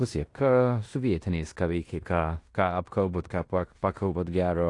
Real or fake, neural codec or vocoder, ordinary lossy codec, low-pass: fake; codec, 16 kHz in and 24 kHz out, 0.9 kbps, LongCat-Audio-Codec, fine tuned four codebook decoder; AAC, 48 kbps; 10.8 kHz